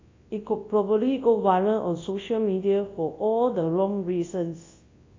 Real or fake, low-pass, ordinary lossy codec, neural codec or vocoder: fake; 7.2 kHz; AAC, 32 kbps; codec, 24 kHz, 0.9 kbps, WavTokenizer, large speech release